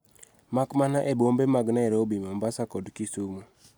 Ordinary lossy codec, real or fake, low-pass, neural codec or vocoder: none; real; none; none